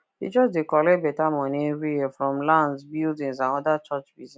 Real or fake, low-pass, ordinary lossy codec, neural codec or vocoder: real; none; none; none